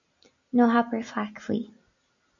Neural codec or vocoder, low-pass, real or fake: none; 7.2 kHz; real